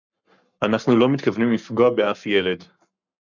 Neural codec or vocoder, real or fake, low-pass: codec, 44.1 kHz, 7.8 kbps, Pupu-Codec; fake; 7.2 kHz